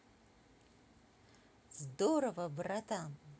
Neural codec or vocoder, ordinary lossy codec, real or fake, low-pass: none; none; real; none